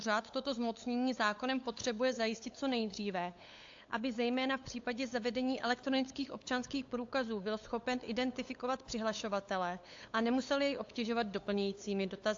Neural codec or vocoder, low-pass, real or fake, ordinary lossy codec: codec, 16 kHz, 8 kbps, FunCodec, trained on LibriTTS, 25 frames a second; 7.2 kHz; fake; AAC, 48 kbps